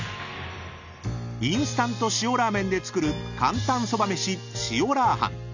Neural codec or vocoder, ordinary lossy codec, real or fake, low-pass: none; none; real; 7.2 kHz